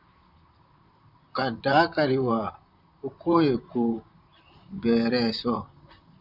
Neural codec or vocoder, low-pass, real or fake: vocoder, 44.1 kHz, 128 mel bands, Pupu-Vocoder; 5.4 kHz; fake